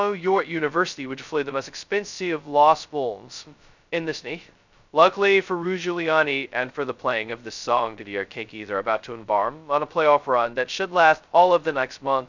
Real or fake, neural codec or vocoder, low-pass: fake; codec, 16 kHz, 0.2 kbps, FocalCodec; 7.2 kHz